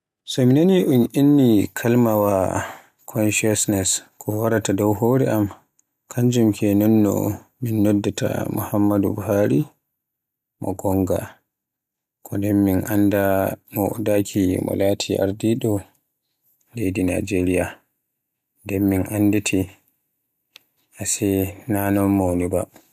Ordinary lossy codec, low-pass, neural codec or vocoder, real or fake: none; 14.4 kHz; none; real